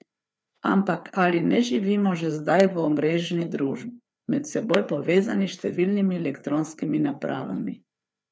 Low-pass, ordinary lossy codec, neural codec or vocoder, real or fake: none; none; codec, 16 kHz, 4 kbps, FreqCodec, larger model; fake